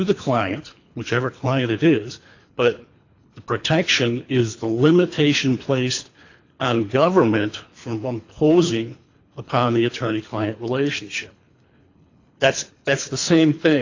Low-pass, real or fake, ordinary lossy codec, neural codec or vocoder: 7.2 kHz; fake; AAC, 48 kbps; codec, 24 kHz, 3 kbps, HILCodec